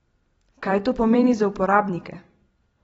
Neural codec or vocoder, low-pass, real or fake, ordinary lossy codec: vocoder, 44.1 kHz, 128 mel bands every 256 samples, BigVGAN v2; 19.8 kHz; fake; AAC, 24 kbps